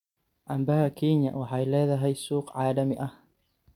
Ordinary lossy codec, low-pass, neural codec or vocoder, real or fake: none; 19.8 kHz; none; real